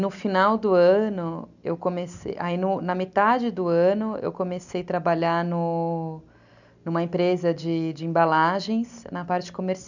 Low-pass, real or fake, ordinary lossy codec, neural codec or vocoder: 7.2 kHz; real; none; none